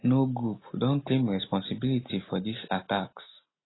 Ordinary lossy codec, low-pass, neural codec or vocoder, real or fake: AAC, 16 kbps; 7.2 kHz; none; real